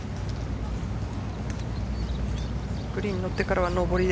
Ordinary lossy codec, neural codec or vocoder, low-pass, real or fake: none; none; none; real